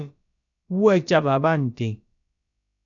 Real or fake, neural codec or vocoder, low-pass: fake; codec, 16 kHz, about 1 kbps, DyCAST, with the encoder's durations; 7.2 kHz